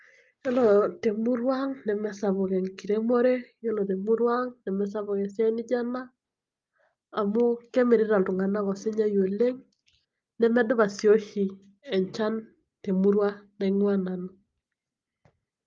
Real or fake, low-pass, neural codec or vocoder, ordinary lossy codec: real; 7.2 kHz; none; Opus, 32 kbps